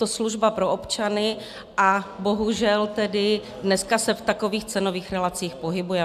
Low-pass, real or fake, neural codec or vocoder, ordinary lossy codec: 14.4 kHz; real; none; AAC, 96 kbps